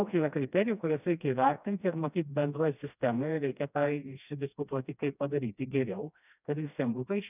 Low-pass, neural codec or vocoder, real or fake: 3.6 kHz; codec, 16 kHz, 1 kbps, FreqCodec, smaller model; fake